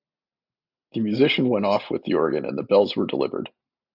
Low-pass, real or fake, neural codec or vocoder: 5.4 kHz; real; none